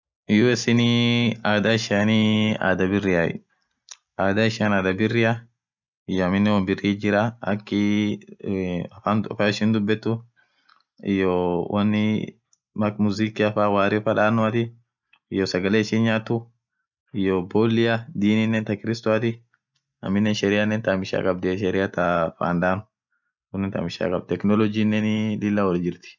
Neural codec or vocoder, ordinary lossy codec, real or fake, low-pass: none; none; real; 7.2 kHz